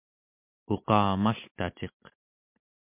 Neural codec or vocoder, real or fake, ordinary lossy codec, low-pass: none; real; MP3, 32 kbps; 3.6 kHz